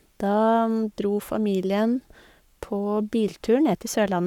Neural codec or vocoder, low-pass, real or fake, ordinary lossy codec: codec, 44.1 kHz, 7.8 kbps, Pupu-Codec; 19.8 kHz; fake; none